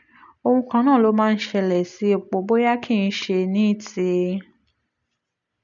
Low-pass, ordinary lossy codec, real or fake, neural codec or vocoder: 7.2 kHz; none; real; none